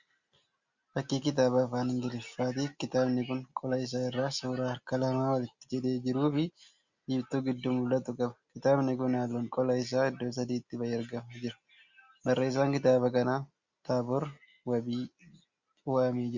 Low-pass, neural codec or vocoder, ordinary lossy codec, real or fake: 7.2 kHz; none; Opus, 64 kbps; real